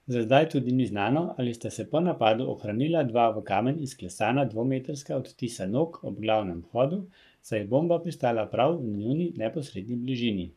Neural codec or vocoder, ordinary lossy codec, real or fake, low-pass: codec, 44.1 kHz, 7.8 kbps, Pupu-Codec; none; fake; 14.4 kHz